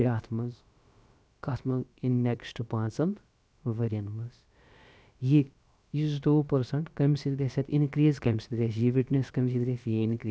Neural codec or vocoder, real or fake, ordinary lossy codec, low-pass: codec, 16 kHz, about 1 kbps, DyCAST, with the encoder's durations; fake; none; none